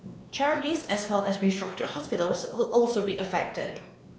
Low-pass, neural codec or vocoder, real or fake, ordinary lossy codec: none; codec, 16 kHz, 2 kbps, X-Codec, WavLM features, trained on Multilingual LibriSpeech; fake; none